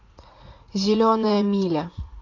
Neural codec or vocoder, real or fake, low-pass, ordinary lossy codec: vocoder, 44.1 kHz, 80 mel bands, Vocos; fake; 7.2 kHz; AAC, 48 kbps